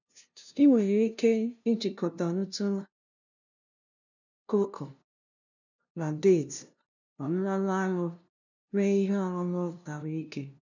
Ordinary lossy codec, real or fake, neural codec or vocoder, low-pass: none; fake; codec, 16 kHz, 0.5 kbps, FunCodec, trained on LibriTTS, 25 frames a second; 7.2 kHz